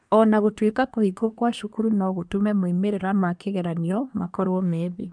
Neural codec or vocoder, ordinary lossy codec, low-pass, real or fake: codec, 24 kHz, 1 kbps, SNAC; none; 9.9 kHz; fake